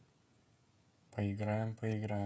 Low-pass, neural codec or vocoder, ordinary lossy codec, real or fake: none; codec, 16 kHz, 16 kbps, FreqCodec, smaller model; none; fake